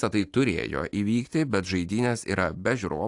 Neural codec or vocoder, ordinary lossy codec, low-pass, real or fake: none; AAC, 64 kbps; 10.8 kHz; real